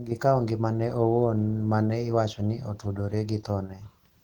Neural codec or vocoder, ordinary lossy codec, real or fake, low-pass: none; Opus, 16 kbps; real; 19.8 kHz